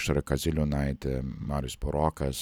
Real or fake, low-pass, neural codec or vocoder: real; 19.8 kHz; none